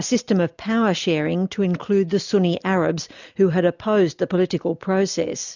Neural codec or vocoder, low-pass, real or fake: none; 7.2 kHz; real